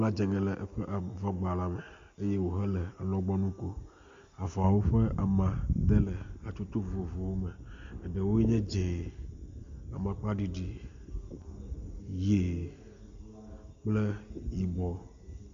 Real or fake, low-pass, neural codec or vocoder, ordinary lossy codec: real; 7.2 kHz; none; MP3, 48 kbps